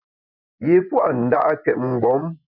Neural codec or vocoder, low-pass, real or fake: vocoder, 24 kHz, 100 mel bands, Vocos; 5.4 kHz; fake